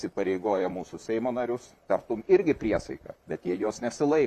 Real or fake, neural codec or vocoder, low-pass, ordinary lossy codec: fake; vocoder, 44.1 kHz, 128 mel bands, Pupu-Vocoder; 14.4 kHz; Opus, 64 kbps